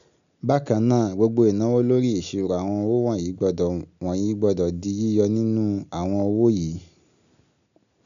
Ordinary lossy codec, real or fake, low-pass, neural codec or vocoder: none; real; 7.2 kHz; none